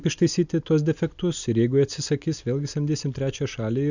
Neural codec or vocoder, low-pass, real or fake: none; 7.2 kHz; real